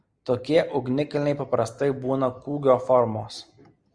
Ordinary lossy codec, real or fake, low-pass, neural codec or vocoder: Opus, 64 kbps; real; 9.9 kHz; none